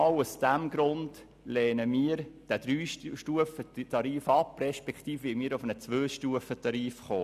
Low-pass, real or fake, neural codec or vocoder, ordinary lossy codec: 14.4 kHz; real; none; none